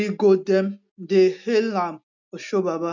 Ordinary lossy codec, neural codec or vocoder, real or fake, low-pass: none; none; real; 7.2 kHz